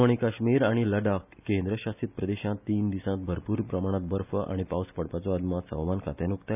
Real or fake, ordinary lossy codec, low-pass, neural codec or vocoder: real; none; 3.6 kHz; none